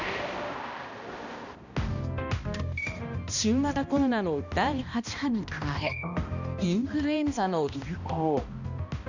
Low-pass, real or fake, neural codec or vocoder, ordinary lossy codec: 7.2 kHz; fake; codec, 16 kHz, 1 kbps, X-Codec, HuBERT features, trained on balanced general audio; none